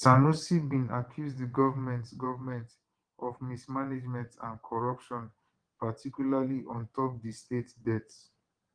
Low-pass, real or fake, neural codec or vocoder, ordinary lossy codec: 9.9 kHz; fake; vocoder, 22.05 kHz, 80 mel bands, WaveNeXt; Opus, 24 kbps